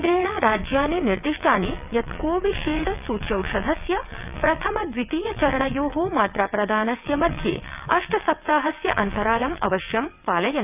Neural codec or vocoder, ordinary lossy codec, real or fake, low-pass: vocoder, 22.05 kHz, 80 mel bands, WaveNeXt; none; fake; 3.6 kHz